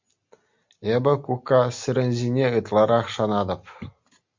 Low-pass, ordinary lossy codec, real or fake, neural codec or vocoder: 7.2 kHz; MP3, 64 kbps; real; none